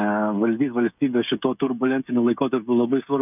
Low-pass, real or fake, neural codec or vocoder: 3.6 kHz; fake; codec, 16 kHz, 16 kbps, FreqCodec, smaller model